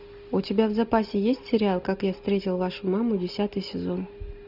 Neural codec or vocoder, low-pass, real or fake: none; 5.4 kHz; real